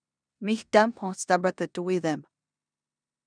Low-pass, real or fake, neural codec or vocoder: 9.9 kHz; fake; codec, 16 kHz in and 24 kHz out, 0.9 kbps, LongCat-Audio-Codec, four codebook decoder